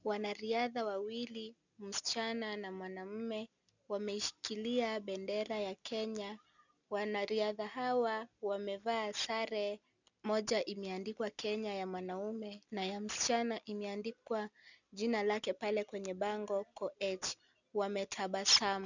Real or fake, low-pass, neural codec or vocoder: real; 7.2 kHz; none